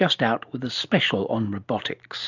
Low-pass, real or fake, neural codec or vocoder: 7.2 kHz; real; none